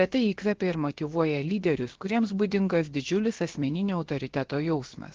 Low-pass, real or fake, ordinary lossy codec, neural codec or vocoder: 7.2 kHz; fake; Opus, 16 kbps; codec, 16 kHz, about 1 kbps, DyCAST, with the encoder's durations